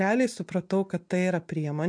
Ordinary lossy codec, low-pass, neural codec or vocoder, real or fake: MP3, 64 kbps; 9.9 kHz; none; real